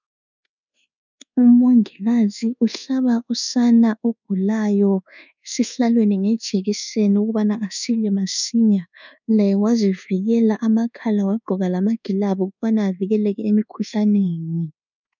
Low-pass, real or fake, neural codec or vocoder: 7.2 kHz; fake; codec, 24 kHz, 1.2 kbps, DualCodec